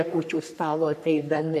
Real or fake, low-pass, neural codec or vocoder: fake; 14.4 kHz; codec, 44.1 kHz, 3.4 kbps, Pupu-Codec